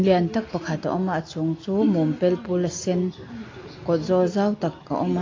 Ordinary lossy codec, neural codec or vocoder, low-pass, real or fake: AAC, 32 kbps; none; 7.2 kHz; real